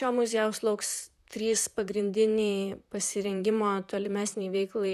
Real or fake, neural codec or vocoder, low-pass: fake; vocoder, 44.1 kHz, 128 mel bands, Pupu-Vocoder; 14.4 kHz